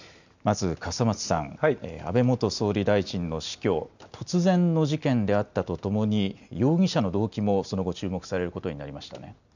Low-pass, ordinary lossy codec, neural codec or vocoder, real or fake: 7.2 kHz; none; none; real